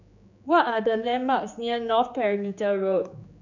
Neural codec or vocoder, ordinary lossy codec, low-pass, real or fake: codec, 16 kHz, 4 kbps, X-Codec, HuBERT features, trained on general audio; none; 7.2 kHz; fake